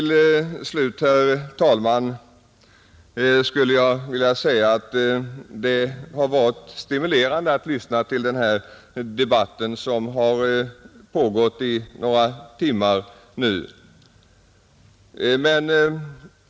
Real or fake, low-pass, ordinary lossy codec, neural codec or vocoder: real; none; none; none